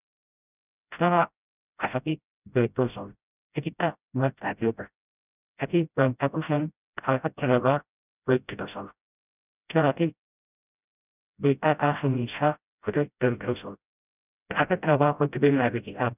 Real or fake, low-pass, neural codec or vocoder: fake; 3.6 kHz; codec, 16 kHz, 0.5 kbps, FreqCodec, smaller model